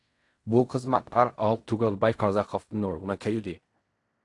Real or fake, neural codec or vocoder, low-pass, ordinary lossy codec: fake; codec, 16 kHz in and 24 kHz out, 0.4 kbps, LongCat-Audio-Codec, fine tuned four codebook decoder; 10.8 kHz; AAC, 48 kbps